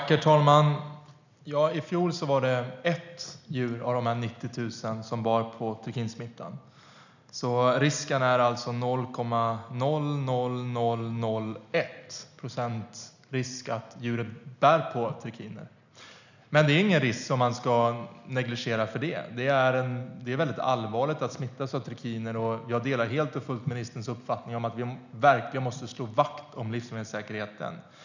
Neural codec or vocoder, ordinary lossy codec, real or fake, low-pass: none; none; real; 7.2 kHz